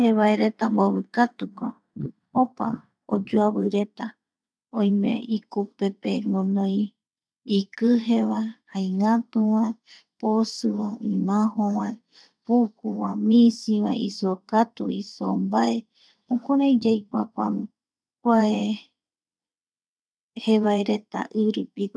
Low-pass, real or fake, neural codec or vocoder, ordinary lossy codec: none; fake; vocoder, 22.05 kHz, 80 mel bands, WaveNeXt; none